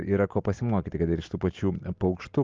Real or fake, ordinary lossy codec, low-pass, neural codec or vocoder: real; Opus, 32 kbps; 7.2 kHz; none